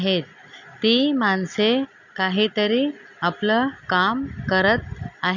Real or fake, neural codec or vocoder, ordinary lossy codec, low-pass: real; none; none; 7.2 kHz